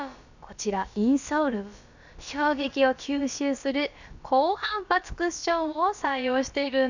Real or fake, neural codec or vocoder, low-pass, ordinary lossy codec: fake; codec, 16 kHz, about 1 kbps, DyCAST, with the encoder's durations; 7.2 kHz; none